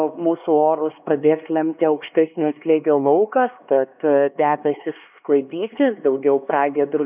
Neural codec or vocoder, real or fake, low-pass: codec, 16 kHz, 2 kbps, X-Codec, HuBERT features, trained on LibriSpeech; fake; 3.6 kHz